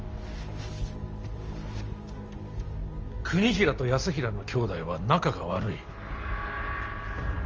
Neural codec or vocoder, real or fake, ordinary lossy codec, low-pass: none; real; Opus, 24 kbps; 7.2 kHz